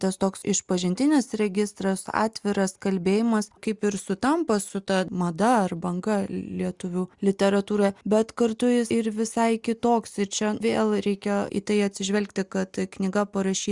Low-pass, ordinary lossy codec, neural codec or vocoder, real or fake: 10.8 kHz; Opus, 64 kbps; none; real